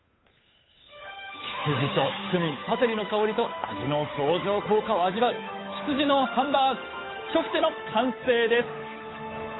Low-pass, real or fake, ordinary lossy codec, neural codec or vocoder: 7.2 kHz; fake; AAC, 16 kbps; codec, 16 kHz, 8 kbps, FunCodec, trained on Chinese and English, 25 frames a second